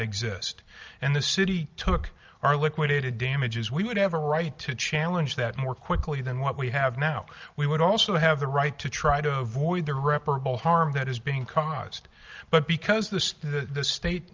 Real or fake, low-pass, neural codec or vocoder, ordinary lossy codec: real; 7.2 kHz; none; Opus, 32 kbps